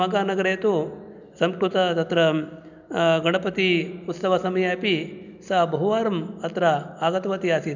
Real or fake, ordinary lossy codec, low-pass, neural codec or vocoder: real; none; 7.2 kHz; none